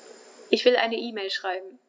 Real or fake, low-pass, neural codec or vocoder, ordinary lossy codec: real; none; none; none